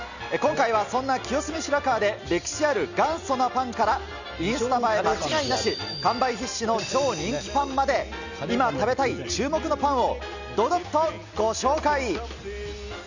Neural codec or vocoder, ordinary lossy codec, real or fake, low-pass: none; none; real; 7.2 kHz